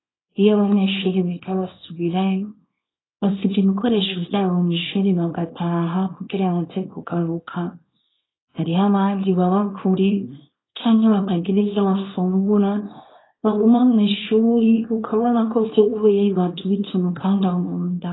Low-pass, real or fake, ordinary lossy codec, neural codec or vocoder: 7.2 kHz; fake; AAC, 16 kbps; codec, 24 kHz, 0.9 kbps, WavTokenizer, small release